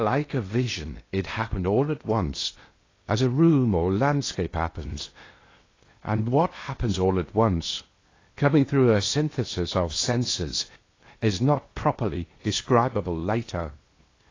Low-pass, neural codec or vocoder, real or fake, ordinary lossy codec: 7.2 kHz; codec, 16 kHz in and 24 kHz out, 0.8 kbps, FocalCodec, streaming, 65536 codes; fake; AAC, 32 kbps